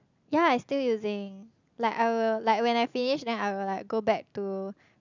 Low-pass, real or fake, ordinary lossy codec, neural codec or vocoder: 7.2 kHz; real; none; none